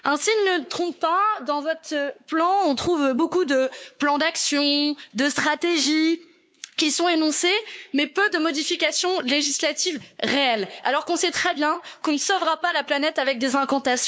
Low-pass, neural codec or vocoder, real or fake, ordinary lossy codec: none; codec, 16 kHz, 4 kbps, X-Codec, WavLM features, trained on Multilingual LibriSpeech; fake; none